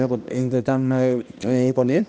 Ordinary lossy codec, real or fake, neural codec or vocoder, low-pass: none; fake; codec, 16 kHz, 1 kbps, X-Codec, HuBERT features, trained on balanced general audio; none